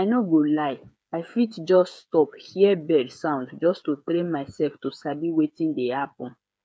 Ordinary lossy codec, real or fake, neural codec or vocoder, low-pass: none; fake; codec, 16 kHz, 8 kbps, FreqCodec, smaller model; none